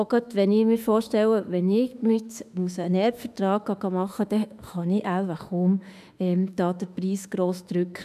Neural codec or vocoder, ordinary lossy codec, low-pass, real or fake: autoencoder, 48 kHz, 32 numbers a frame, DAC-VAE, trained on Japanese speech; none; 14.4 kHz; fake